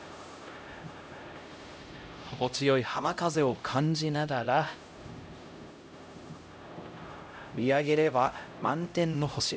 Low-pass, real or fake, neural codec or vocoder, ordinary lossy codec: none; fake; codec, 16 kHz, 0.5 kbps, X-Codec, HuBERT features, trained on LibriSpeech; none